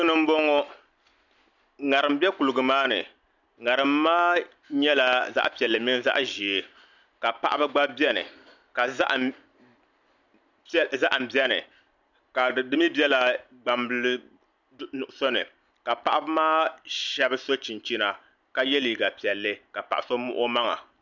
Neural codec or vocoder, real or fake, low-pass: none; real; 7.2 kHz